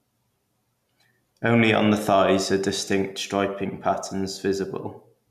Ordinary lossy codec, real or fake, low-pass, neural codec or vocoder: none; real; 14.4 kHz; none